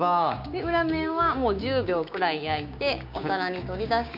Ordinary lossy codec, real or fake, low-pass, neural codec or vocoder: none; fake; 5.4 kHz; codec, 16 kHz, 6 kbps, DAC